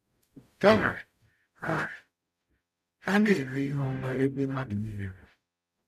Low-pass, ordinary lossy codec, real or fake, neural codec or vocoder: 14.4 kHz; none; fake; codec, 44.1 kHz, 0.9 kbps, DAC